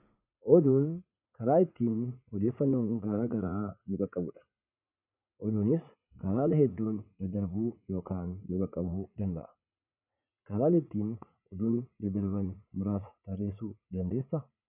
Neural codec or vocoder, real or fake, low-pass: vocoder, 44.1 kHz, 80 mel bands, Vocos; fake; 3.6 kHz